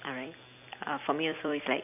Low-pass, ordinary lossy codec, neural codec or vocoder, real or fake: 3.6 kHz; none; vocoder, 44.1 kHz, 128 mel bands every 256 samples, BigVGAN v2; fake